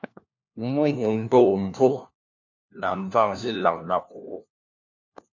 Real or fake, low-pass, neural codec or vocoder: fake; 7.2 kHz; codec, 16 kHz, 1 kbps, FunCodec, trained on LibriTTS, 50 frames a second